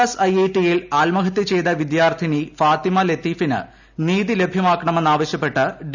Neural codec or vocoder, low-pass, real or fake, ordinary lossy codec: none; 7.2 kHz; real; none